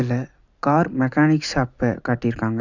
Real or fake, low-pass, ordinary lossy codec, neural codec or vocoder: real; 7.2 kHz; none; none